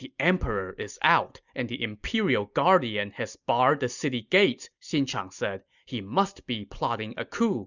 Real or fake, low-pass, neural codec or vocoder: real; 7.2 kHz; none